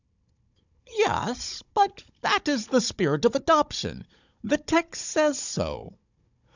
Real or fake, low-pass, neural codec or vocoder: fake; 7.2 kHz; codec, 16 kHz, 16 kbps, FunCodec, trained on Chinese and English, 50 frames a second